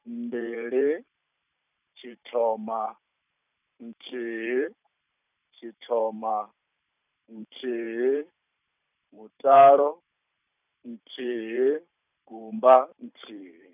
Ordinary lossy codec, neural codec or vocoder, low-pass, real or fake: none; vocoder, 44.1 kHz, 128 mel bands every 512 samples, BigVGAN v2; 3.6 kHz; fake